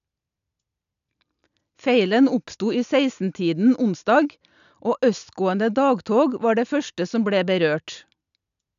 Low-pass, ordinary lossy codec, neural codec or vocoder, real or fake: 7.2 kHz; none; none; real